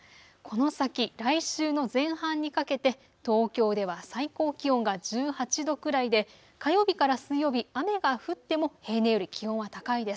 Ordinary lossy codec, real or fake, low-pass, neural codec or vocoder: none; real; none; none